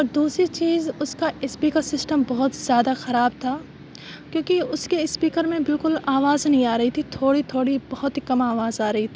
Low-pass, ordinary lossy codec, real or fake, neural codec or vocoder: none; none; real; none